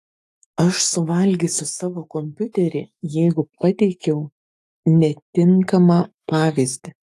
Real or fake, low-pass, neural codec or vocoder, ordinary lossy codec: fake; 14.4 kHz; autoencoder, 48 kHz, 128 numbers a frame, DAC-VAE, trained on Japanese speech; AAC, 64 kbps